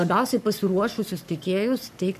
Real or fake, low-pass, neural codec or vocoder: fake; 14.4 kHz; codec, 44.1 kHz, 7.8 kbps, Pupu-Codec